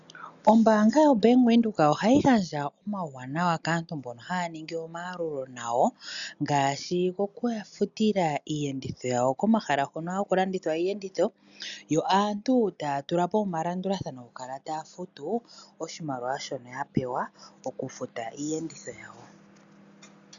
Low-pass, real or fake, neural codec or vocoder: 7.2 kHz; real; none